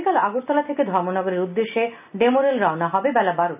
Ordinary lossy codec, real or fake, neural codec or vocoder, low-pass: none; real; none; 3.6 kHz